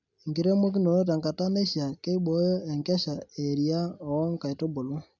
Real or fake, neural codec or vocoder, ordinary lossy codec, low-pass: real; none; none; 7.2 kHz